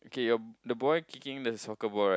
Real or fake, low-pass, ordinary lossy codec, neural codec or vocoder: real; none; none; none